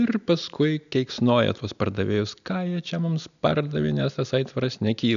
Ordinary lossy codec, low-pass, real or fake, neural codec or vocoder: MP3, 96 kbps; 7.2 kHz; real; none